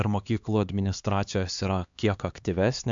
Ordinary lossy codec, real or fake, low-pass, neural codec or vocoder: MP3, 64 kbps; fake; 7.2 kHz; codec, 16 kHz, 2 kbps, X-Codec, HuBERT features, trained on LibriSpeech